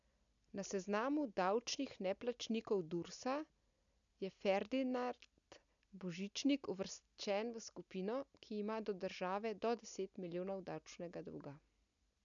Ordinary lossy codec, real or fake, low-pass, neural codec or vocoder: none; real; 7.2 kHz; none